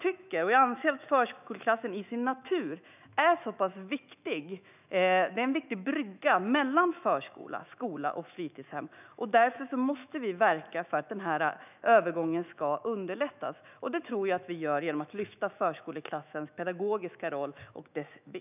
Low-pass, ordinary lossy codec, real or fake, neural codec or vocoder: 3.6 kHz; none; fake; autoencoder, 48 kHz, 128 numbers a frame, DAC-VAE, trained on Japanese speech